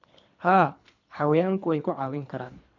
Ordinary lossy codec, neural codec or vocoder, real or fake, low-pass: none; codec, 24 kHz, 3 kbps, HILCodec; fake; 7.2 kHz